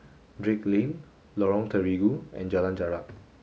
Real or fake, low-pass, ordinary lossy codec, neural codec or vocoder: real; none; none; none